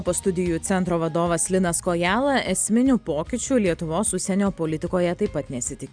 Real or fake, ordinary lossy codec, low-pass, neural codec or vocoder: real; Opus, 64 kbps; 9.9 kHz; none